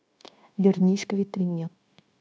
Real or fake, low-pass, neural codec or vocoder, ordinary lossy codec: fake; none; codec, 16 kHz, 0.9 kbps, LongCat-Audio-Codec; none